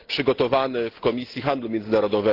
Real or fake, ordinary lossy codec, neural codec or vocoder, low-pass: real; Opus, 16 kbps; none; 5.4 kHz